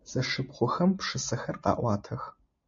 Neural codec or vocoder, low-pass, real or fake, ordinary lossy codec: none; 7.2 kHz; real; MP3, 48 kbps